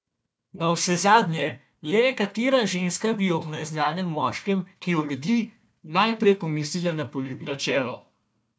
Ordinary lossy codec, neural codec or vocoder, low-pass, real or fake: none; codec, 16 kHz, 1 kbps, FunCodec, trained on Chinese and English, 50 frames a second; none; fake